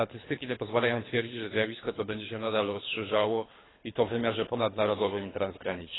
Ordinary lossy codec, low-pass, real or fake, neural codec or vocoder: AAC, 16 kbps; 7.2 kHz; fake; codec, 24 kHz, 3 kbps, HILCodec